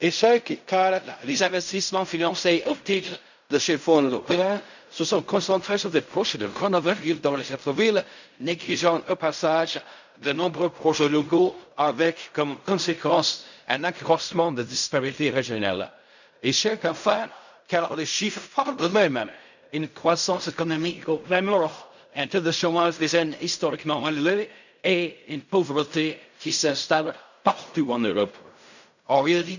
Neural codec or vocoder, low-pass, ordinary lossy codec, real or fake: codec, 16 kHz in and 24 kHz out, 0.4 kbps, LongCat-Audio-Codec, fine tuned four codebook decoder; 7.2 kHz; none; fake